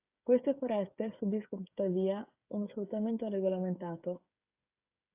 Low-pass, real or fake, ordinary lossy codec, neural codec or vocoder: 3.6 kHz; fake; AAC, 32 kbps; codec, 16 kHz, 16 kbps, FreqCodec, smaller model